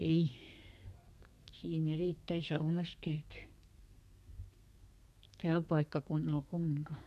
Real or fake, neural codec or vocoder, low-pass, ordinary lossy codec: fake; codec, 32 kHz, 1.9 kbps, SNAC; 14.4 kHz; none